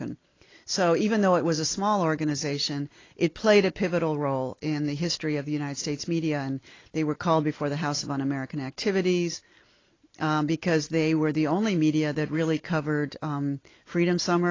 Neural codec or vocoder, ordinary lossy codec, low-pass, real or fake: none; AAC, 32 kbps; 7.2 kHz; real